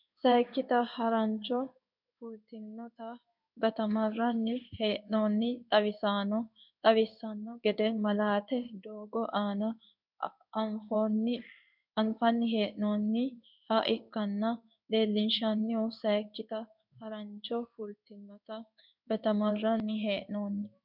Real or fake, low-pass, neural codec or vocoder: fake; 5.4 kHz; codec, 16 kHz in and 24 kHz out, 1 kbps, XY-Tokenizer